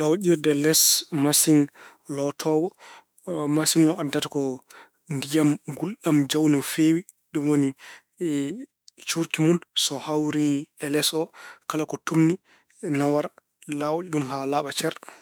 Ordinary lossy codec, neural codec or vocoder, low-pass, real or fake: none; autoencoder, 48 kHz, 32 numbers a frame, DAC-VAE, trained on Japanese speech; none; fake